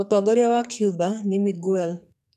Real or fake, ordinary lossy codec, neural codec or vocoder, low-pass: fake; none; codec, 44.1 kHz, 2.6 kbps, SNAC; 14.4 kHz